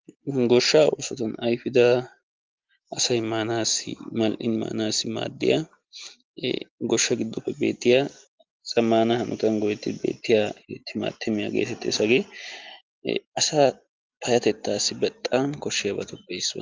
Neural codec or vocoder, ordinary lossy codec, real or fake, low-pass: none; Opus, 32 kbps; real; 7.2 kHz